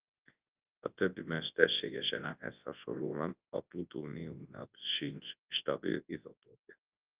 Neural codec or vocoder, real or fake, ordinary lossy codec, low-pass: codec, 24 kHz, 0.9 kbps, WavTokenizer, large speech release; fake; Opus, 24 kbps; 3.6 kHz